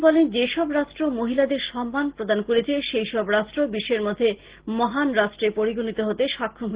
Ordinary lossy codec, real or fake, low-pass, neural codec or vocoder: Opus, 16 kbps; real; 3.6 kHz; none